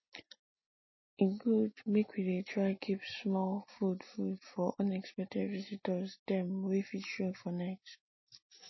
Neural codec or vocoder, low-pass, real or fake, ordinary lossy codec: none; 7.2 kHz; real; MP3, 24 kbps